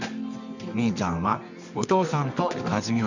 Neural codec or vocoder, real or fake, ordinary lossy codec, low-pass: codec, 24 kHz, 0.9 kbps, WavTokenizer, medium music audio release; fake; none; 7.2 kHz